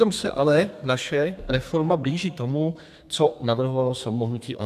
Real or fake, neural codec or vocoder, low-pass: fake; codec, 32 kHz, 1.9 kbps, SNAC; 14.4 kHz